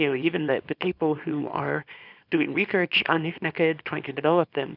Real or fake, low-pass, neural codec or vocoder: fake; 5.4 kHz; codec, 24 kHz, 0.9 kbps, WavTokenizer, small release